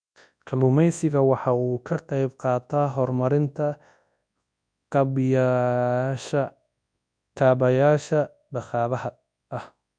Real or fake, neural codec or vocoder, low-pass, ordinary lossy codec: fake; codec, 24 kHz, 0.9 kbps, WavTokenizer, large speech release; 9.9 kHz; none